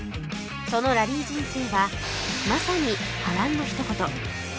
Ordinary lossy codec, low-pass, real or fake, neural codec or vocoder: none; none; real; none